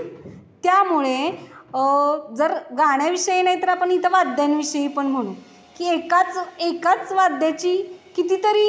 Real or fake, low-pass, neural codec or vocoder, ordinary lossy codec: real; none; none; none